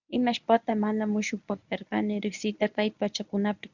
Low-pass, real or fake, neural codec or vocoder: 7.2 kHz; fake; codec, 24 kHz, 0.9 kbps, WavTokenizer, medium speech release version 1